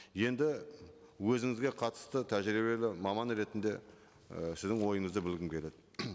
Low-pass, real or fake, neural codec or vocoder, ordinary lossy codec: none; real; none; none